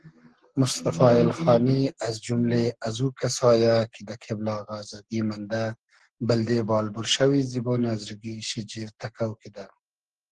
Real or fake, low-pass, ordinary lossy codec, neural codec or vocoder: fake; 10.8 kHz; Opus, 16 kbps; codec, 44.1 kHz, 7.8 kbps, Pupu-Codec